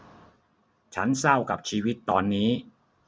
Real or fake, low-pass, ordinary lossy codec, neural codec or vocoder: real; none; none; none